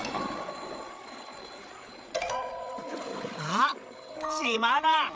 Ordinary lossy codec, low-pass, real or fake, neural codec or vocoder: none; none; fake; codec, 16 kHz, 8 kbps, FreqCodec, larger model